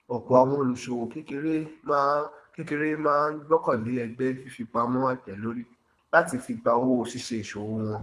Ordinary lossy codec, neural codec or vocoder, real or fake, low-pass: none; codec, 24 kHz, 3 kbps, HILCodec; fake; none